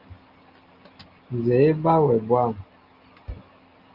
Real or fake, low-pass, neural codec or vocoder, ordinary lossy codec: real; 5.4 kHz; none; Opus, 32 kbps